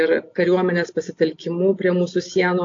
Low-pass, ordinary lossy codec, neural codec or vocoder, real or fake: 7.2 kHz; AAC, 48 kbps; none; real